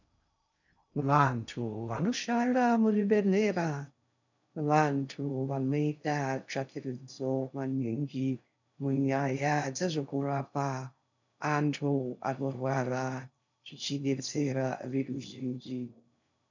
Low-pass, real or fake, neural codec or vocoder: 7.2 kHz; fake; codec, 16 kHz in and 24 kHz out, 0.6 kbps, FocalCodec, streaming, 2048 codes